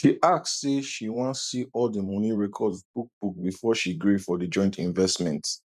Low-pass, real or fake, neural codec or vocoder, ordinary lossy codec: 14.4 kHz; fake; vocoder, 44.1 kHz, 128 mel bands every 512 samples, BigVGAN v2; none